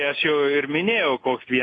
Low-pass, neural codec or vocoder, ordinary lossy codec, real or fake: 9.9 kHz; none; AAC, 32 kbps; real